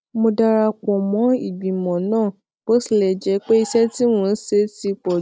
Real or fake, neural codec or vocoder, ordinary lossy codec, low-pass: real; none; none; none